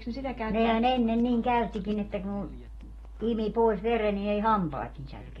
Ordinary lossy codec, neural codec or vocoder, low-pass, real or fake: AAC, 32 kbps; none; 19.8 kHz; real